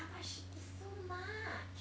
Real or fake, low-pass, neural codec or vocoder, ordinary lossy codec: real; none; none; none